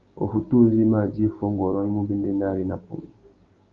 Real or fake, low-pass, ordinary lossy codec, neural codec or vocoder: fake; 7.2 kHz; Opus, 32 kbps; codec, 16 kHz, 6 kbps, DAC